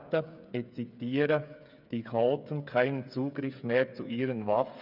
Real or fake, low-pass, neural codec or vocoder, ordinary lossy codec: fake; 5.4 kHz; codec, 16 kHz, 8 kbps, FreqCodec, smaller model; none